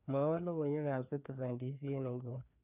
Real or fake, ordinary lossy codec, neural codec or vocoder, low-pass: fake; none; codec, 16 kHz, 2 kbps, FreqCodec, larger model; 3.6 kHz